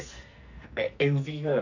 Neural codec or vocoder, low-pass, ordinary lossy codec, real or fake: codec, 44.1 kHz, 2.6 kbps, SNAC; 7.2 kHz; none; fake